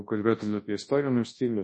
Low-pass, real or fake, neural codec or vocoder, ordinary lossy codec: 10.8 kHz; fake; codec, 24 kHz, 0.9 kbps, WavTokenizer, large speech release; MP3, 32 kbps